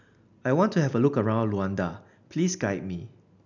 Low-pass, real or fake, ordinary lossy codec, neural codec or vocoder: 7.2 kHz; real; none; none